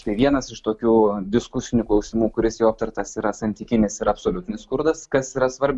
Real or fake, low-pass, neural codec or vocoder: real; 10.8 kHz; none